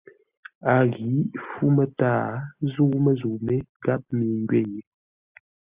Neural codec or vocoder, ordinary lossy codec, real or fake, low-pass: none; Opus, 64 kbps; real; 3.6 kHz